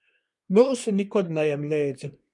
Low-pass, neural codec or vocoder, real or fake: 10.8 kHz; codec, 24 kHz, 1 kbps, SNAC; fake